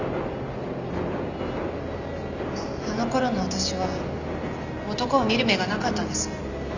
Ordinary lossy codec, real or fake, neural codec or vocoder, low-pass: none; real; none; 7.2 kHz